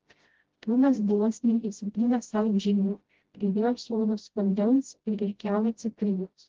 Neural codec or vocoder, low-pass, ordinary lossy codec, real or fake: codec, 16 kHz, 0.5 kbps, FreqCodec, smaller model; 7.2 kHz; Opus, 16 kbps; fake